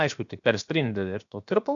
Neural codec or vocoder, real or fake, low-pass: codec, 16 kHz, about 1 kbps, DyCAST, with the encoder's durations; fake; 7.2 kHz